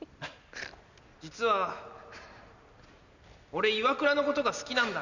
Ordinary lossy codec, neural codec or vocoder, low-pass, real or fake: none; none; 7.2 kHz; real